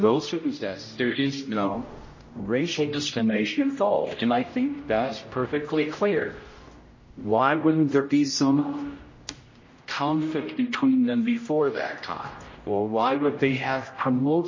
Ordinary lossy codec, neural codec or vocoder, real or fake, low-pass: MP3, 32 kbps; codec, 16 kHz, 0.5 kbps, X-Codec, HuBERT features, trained on general audio; fake; 7.2 kHz